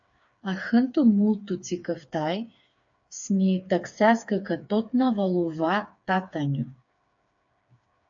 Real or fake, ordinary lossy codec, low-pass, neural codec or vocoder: fake; AAC, 64 kbps; 7.2 kHz; codec, 16 kHz, 4 kbps, FreqCodec, smaller model